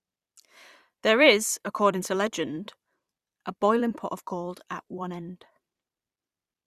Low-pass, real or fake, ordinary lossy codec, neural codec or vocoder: 14.4 kHz; fake; Opus, 64 kbps; vocoder, 44.1 kHz, 128 mel bands every 256 samples, BigVGAN v2